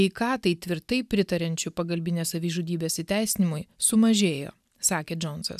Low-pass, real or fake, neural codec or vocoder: 14.4 kHz; real; none